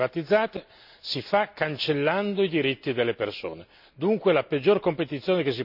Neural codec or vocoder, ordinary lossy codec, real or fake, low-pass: none; AAC, 48 kbps; real; 5.4 kHz